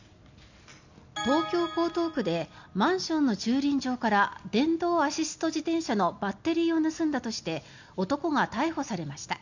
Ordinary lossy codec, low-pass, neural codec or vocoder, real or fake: none; 7.2 kHz; none; real